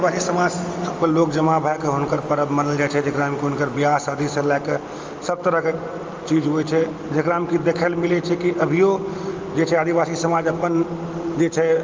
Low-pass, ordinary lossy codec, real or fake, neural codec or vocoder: 7.2 kHz; Opus, 32 kbps; real; none